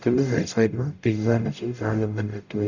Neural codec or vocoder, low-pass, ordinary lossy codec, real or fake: codec, 44.1 kHz, 0.9 kbps, DAC; 7.2 kHz; none; fake